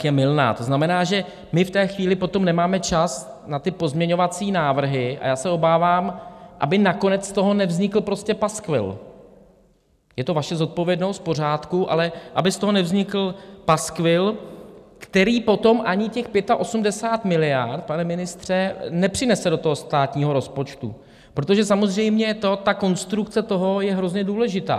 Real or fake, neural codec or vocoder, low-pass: real; none; 14.4 kHz